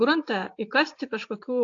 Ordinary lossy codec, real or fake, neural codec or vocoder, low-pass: AAC, 48 kbps; real; none; 7.2 kHz